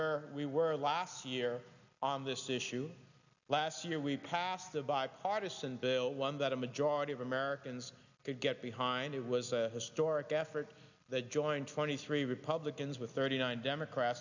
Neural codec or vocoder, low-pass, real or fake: none; 7.2 kHz; real